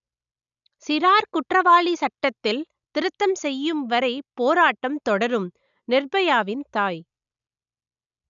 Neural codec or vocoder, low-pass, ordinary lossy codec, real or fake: none; 7.2 kHz; none; real